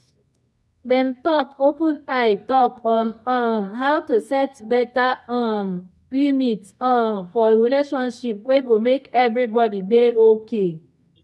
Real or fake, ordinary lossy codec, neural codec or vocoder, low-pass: fake; none; codec, 24 kHz, 0.9 kbps, WavTokenizer, medium music audio release; none